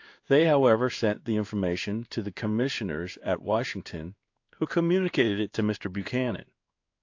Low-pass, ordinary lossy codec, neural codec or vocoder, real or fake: 7.2 kHz; AAC, 48 kbps; codec, 16 kHz in and 24 kHz out, 1 kbps, XY-Tokenizer; fake